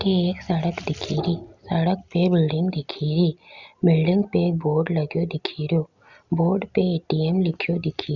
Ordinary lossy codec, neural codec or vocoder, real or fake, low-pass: Opus, 64 kbps; none; real; 7.2 kHz